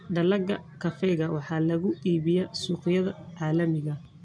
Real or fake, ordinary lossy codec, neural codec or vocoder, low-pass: real; none; none; 9.9 kHz